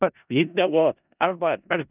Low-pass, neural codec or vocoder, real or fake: 3.6 kHz; codec, 16 kHz in and 24 kHz out, 0.4 kbps, LongCat-Audio-Codec, four codebook decoder; fake